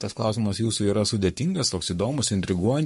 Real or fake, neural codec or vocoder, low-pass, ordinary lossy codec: fake; codec, 44.1 kHz, 7.8 kbps, Pupu-Codec; 14.4 kHz; MP3, 48 kbps